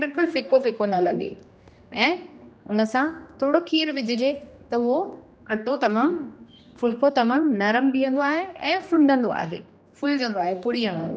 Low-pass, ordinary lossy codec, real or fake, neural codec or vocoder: none; none; fake; codec, 16 kHz, 1 kbps, X-Codec, HuBERT features, trained on general audio